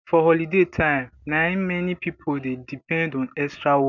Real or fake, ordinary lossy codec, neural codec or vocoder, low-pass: real; none; none; 7.2 kHz